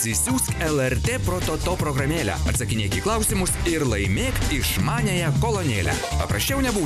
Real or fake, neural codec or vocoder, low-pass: real; none; 14.4 kHz